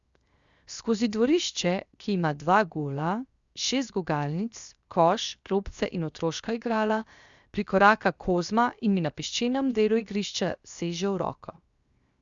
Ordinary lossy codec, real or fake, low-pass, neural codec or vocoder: Opus, 64 kbps; fake; 7.2 kHz; codec, 16 kHz, 0.7 kbps, FocalCodec